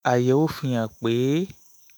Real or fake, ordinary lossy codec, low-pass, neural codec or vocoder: fake; none; none; autoencoder, 48 kHz, 128 numbers a frame, DAC-VAE, trained on Japanese speech